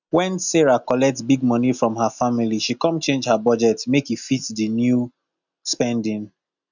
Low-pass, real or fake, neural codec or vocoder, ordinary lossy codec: 7.2 kHz; real; none; none